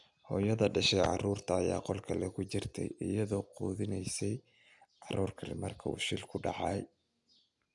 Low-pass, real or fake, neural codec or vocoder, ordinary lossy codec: 10.8 kHz; real; none; none